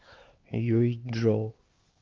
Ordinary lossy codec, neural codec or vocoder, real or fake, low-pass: Opus, 16 kbps; codec, 16 kHz, 4 kbps, X-Codec, HuBERT features, trained on LibriSpeech; fake; 7.2 kHz